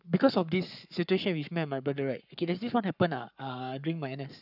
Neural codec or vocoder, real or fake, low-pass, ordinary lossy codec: codec, 44.1 kHz, 7.8 kbps, Pupu-Codec; fake; 5.4 kHz; none